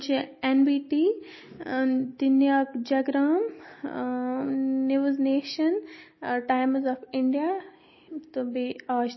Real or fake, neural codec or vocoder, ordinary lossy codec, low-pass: real; none; MP3, 24 kbps; 7.2 kHz